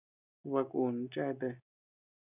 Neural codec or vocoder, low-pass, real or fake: none; 3.6 kHz; real